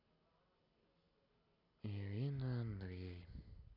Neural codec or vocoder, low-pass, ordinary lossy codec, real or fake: none; 5.4 kHz; none; real